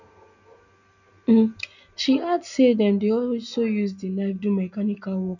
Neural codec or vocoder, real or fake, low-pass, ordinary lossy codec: none; real; 7.2 kHz; none